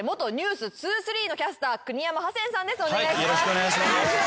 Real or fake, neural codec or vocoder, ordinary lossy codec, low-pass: real; none; none; none